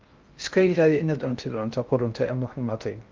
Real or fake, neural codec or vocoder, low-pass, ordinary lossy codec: fake; codec, 16 kHz in and 24 kHz out, 0.6 kbps, FocalCodec, streaming, 4096 codes; 7.2 kHz; Opus, 24 kbps